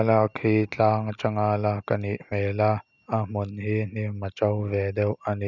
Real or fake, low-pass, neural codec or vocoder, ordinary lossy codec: real; 7.2 kHz; none; none